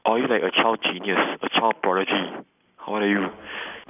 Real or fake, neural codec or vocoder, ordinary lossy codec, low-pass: real; none; none; 3.6 kHz